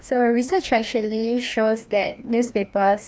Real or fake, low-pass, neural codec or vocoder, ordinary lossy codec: fake; none; codec, 16 kHz, 1 kbps, FreqCodec, larger model; none